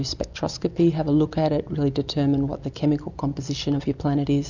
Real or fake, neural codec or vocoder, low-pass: real; none; 7.2 kHz